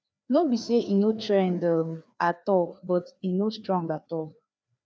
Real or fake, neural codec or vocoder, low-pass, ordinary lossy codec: fake; codec, 16 kHz, 2 kbps, FreqCodec, larger model; none; none